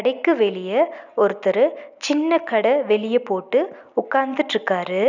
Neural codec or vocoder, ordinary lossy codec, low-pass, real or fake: none; none; 7.2 kHz; real